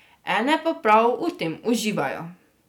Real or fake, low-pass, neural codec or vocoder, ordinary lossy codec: real; 19.8 kHz; none; none